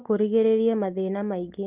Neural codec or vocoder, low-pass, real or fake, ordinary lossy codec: none; 3.6 kHz; real; Opus, 32 kbps